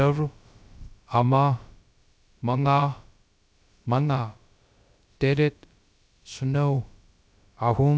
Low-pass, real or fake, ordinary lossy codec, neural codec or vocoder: none; fake; none; codec, 16 kHz, about 1 kbps, DyCAST, with the encoder's durations